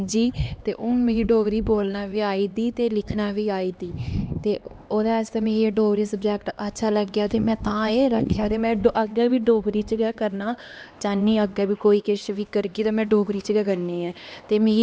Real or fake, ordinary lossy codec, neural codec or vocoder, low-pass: fake; none; codec, 16 kHz, 2 kbps, X-Codec, HuBERT features, trained on LibriSpeech; none